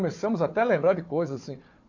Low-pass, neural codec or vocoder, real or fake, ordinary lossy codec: 7.2 kHz; codec, 16 kHz, 4 kbps, FunCodec, trained on Chinese and English, 50 frames a second; fake; AAC, 48 kbps